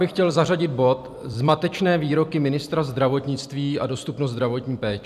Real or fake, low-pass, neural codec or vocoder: fake; 14.4 kHz; vocoder, 44.1 kHz, 128 mel bands every 256 samples, BigVGAN v2